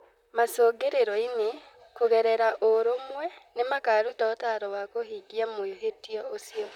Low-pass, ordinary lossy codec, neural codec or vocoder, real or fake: 19.8 kHz; none; vocoder, 44.1 kHz, 128 mel bands, Pupu-Vocoder; fake